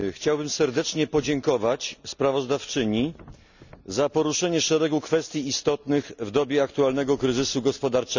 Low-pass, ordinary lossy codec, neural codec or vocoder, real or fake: 7.2 kHz; none; none; real